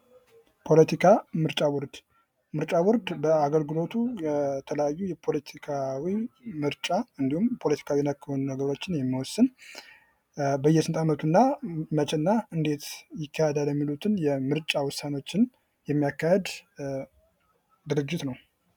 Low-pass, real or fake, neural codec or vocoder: 19.8 kHz; real; none